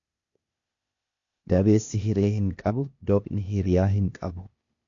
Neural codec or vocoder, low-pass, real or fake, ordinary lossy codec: codec, 16 kHz, 0.8 kbps, ZipCodec; 7.2 kHz; fake; MP3, 48 kbps